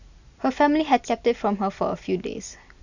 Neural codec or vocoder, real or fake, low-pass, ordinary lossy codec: none; real; 7.2 kHz; none